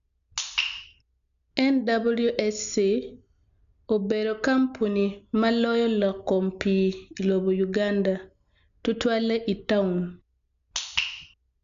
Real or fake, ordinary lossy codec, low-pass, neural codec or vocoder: real; AAC, 96 kbps; 7.2 kHz; none